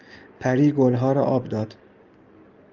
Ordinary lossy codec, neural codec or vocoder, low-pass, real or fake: Opus, 24 kbps; none; 7.2 kHz; real